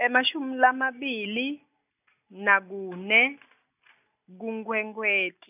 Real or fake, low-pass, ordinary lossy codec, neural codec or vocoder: real; 3.6 kHz; none; none